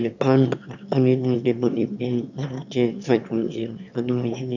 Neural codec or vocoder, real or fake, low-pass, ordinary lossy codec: autoencoder, 22.05 kHz, a latent of 192 numbers a frame, VITS, trained on one speaker; fake; 7.2 kHz; none